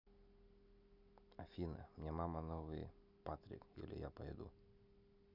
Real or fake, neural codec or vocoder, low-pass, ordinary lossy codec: real; none; 5.4 kHz; none